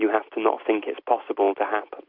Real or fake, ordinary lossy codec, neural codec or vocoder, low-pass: real; MP3, 48 kbps; none; 5.4 kHz